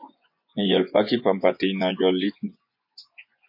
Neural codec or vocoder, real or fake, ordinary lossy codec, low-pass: vocoder, 24 kHz, 100 mel bands, Vocos; fake; MP3, 32 kbps; 5.4 kHz